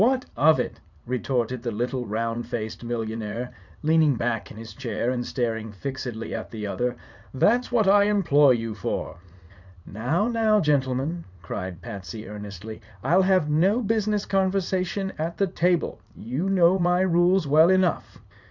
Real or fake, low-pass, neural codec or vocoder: fake; 7.2 kHz; vocoder, 44.1 kHz, 80 mel bands, Vocos